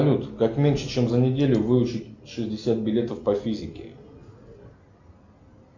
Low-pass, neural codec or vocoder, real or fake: 7.2 kHz; none; real